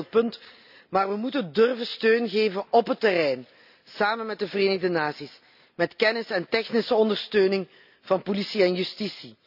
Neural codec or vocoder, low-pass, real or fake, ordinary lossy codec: none; 5.4 kHz; real; none